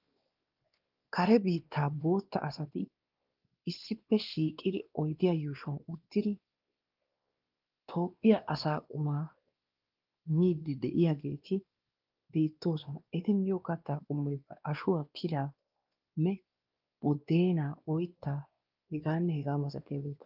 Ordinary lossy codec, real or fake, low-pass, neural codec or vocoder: Opus, 32 kbps; fake; 5.4 kHz; codec, 16 kHz, 2 kbps, X-Codec, WavLM features, trained on Multilingual LibriSpeech